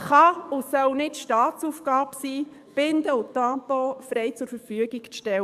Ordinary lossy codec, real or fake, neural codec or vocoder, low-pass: none; fake; codec, 44.1 kHz, 7.8 kbps, DAC; 14.4 kHz